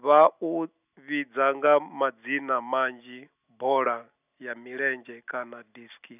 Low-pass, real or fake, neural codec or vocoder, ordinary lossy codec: 3.6 kHz; real; none; none